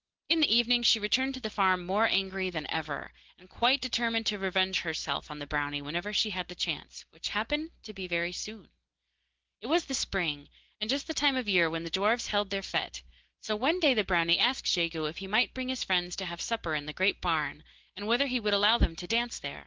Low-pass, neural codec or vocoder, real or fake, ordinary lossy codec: 7.2 kHz; none; real; Opus, 16 kbps